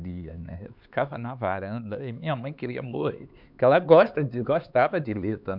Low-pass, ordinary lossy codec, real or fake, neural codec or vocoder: 5.4 kHz; none; fake; codec, 16 kHz, 4 kbps, X-Codec, HuBERT features, trained on LibriSpeech